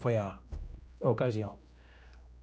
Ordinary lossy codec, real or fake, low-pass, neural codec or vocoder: none; fake; none; codec, 16 kHz, 1 kbps, X-Codec, HuBERT features, trained on general audio